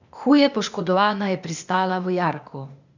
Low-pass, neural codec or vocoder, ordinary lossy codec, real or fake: 7.2 kHz; codec, 16 kHz, 0.8 kbps, ZipCodec; none; fake